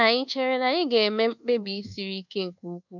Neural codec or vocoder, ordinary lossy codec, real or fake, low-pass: codec, 24 kHz, 1.2 kbps, DualCodec; none; fake; 7.2 kHz